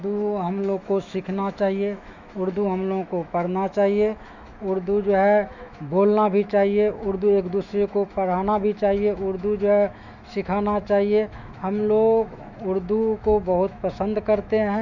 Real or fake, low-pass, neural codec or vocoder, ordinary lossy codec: fake; 7.2 kHz; autoencoder, 48 kHz, 128 numbers a frame, DAC-VAE, trained on Japanese speech; none